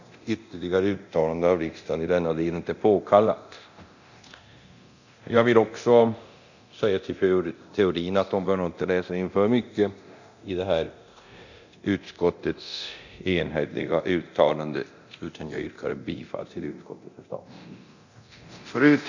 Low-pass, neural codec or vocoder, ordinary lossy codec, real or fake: 7.2 kHz; codec, 24 kHz, 0.9 kbps, DualCodec; none; fake